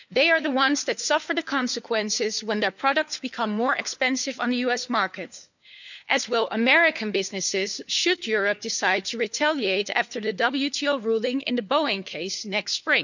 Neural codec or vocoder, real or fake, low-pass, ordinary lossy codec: codec, 16 kHz, 4 kbps, FunCodec, trained on LibriTTS, 50 frames a second; fake; 7.2 kHz; none